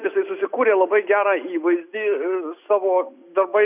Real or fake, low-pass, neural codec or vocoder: real; 3.6 kHz; none